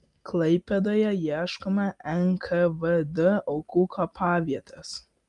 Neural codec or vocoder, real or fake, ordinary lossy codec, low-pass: none; real; Opus, 32 kbps; 10.8 kHz